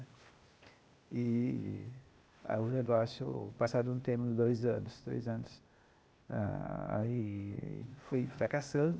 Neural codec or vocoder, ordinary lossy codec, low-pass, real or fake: codec, 16 kHz, 0.8 kbps, ZipCodec; none; none; fake